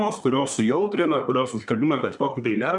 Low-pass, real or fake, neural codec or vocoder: 10.8 kHz; fake; codec, 24 kHz, 1 kbps, SNAC